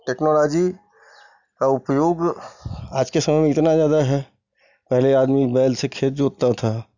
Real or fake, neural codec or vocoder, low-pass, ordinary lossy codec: real; none; 7.2 kHz; none